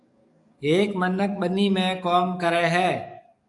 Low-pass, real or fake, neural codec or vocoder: 10.8 kHz; fake; codec, 44.1 kHz, 7.8 kbps, DAC